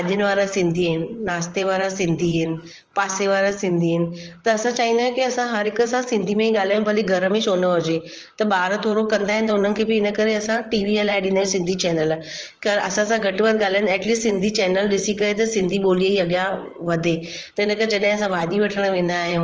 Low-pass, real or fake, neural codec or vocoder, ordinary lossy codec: 7.2 kHz; fake; vocoder, 44.1 kHz, 128 mel bands, Pupu-Vocoder; Opus, 24 kbps